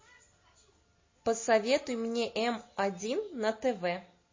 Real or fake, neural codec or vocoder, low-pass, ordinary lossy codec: real; none; 7.2 kHz; MP3, 32 kbps